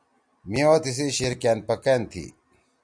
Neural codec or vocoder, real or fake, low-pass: none; real; 9.9 kHz